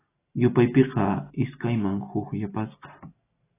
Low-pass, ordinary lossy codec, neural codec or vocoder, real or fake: 3.6 kHz; AAC, 16 kbps; none; real